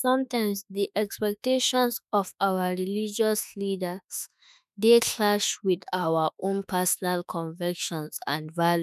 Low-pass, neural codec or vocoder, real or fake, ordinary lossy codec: 14.4 kHz; autoencoder, 48 kHz, 32 numbers a frame, DAC-VAE, trained on Japanese speech; fake; none